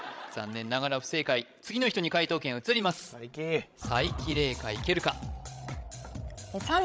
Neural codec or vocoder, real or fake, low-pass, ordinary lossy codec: codec, 16 kHz, 16 kbps, FreqCodec, larger model; fake; none; none